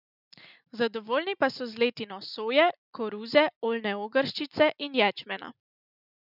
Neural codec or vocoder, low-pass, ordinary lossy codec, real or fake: none; 5.4 kHz; none; real